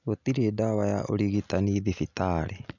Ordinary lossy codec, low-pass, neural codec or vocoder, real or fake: none; 7.2 kHz; none; real